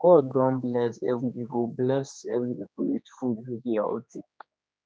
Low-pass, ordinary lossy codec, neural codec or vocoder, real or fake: none; none; codec, 16 kHz, 2 kbps, X-Codec, HuBERT features, trained on general audio; fake